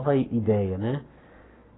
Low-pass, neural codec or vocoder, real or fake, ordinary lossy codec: 7.2 kHz; codec, 44.1 kHz, 7.8 kbps, Pupu-Codec; fake; AAC, 16 kbps